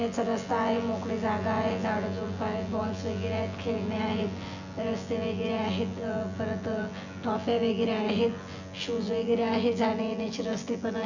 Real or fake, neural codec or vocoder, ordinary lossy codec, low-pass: fake; vocoder, 24 kHz, 100 mel bands, Vocos; none; 7.2 kHz